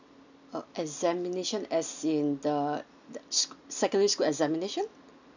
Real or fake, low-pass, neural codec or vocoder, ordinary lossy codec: real; 7.2 kHz; none; none